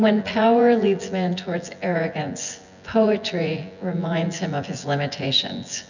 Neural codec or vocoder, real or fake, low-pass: vocoder, 24 kHz, 100 mel bands, Vocos; fake; 7.2 kHz